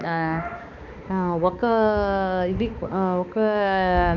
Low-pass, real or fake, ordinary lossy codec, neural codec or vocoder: 7.2 kHz; fake; none; codec, 16 kHz, 2 kbps, X-Codec, HuBERT features, trained on balanced general audio